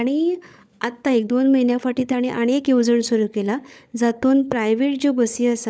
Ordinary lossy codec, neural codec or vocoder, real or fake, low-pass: none; codec, 16 kHz, 16 kbps, FunCodec, trained on LibriTTS, 50 frames a second; fake; none